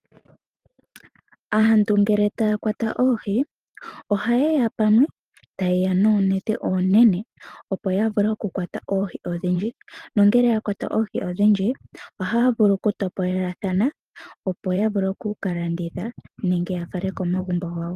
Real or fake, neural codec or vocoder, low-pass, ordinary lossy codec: fake; vocoder, 44.1 kHz, 128 mel bands every 512 samples, BigVGAN v2; 14.4 kHz; Opus, 32 kbps